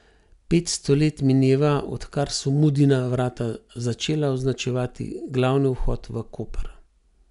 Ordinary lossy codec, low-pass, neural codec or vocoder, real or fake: none; 10.8 kHz; none; real